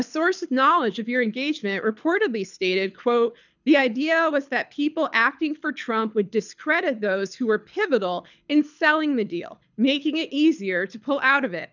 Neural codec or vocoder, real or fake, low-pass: codec, 24 kHz, 6 kbps, HILCodec; fake; 7.2 kHz